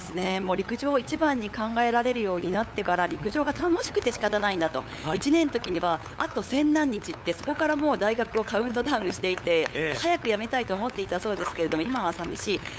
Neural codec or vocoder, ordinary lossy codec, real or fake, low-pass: codec, 16 kHz, 8 kbps, FunCodec, trained on LibriTTS, 25 frames a second; none; fake; none